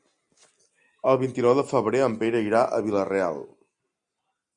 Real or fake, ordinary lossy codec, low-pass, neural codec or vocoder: real; Opus, 64 kbps; 9.9 kHz; none